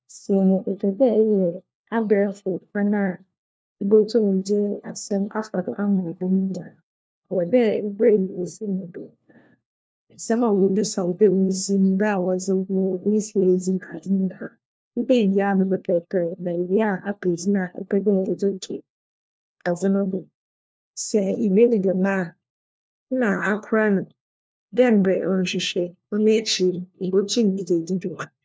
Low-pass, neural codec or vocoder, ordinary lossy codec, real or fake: none; codec, 16 kHz, 1 kbps, FunCodec, trained on LibriTTS, 50 frames a second; none; fake